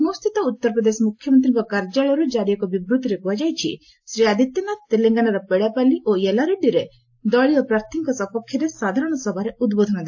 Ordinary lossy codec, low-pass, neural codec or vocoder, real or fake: AAC, 48 kbps; 7.2 kHz; none; real